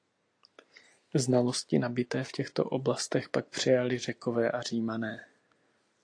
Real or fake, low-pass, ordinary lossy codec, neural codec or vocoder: real; 9.9 kHz; AAC, 48 kbps; none